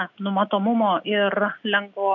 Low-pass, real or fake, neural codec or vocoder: 7.2 kHz; real; none